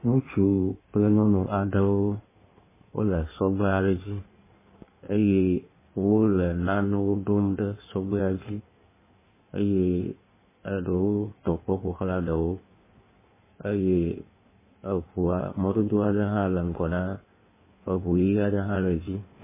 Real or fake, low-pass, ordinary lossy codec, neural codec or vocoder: fake; 3.6 kHz; MP3, 16 kbps; codec, 16 kHz in and 24 kHz out, 1.1 kbps, FireRedTTS-2 codec